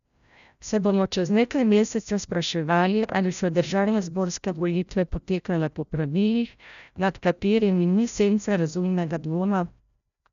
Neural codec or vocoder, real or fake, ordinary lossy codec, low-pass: codec, 16 kHz, 0.5 kbps, FreqCodec, larger model; fake; none; 7.2 kHz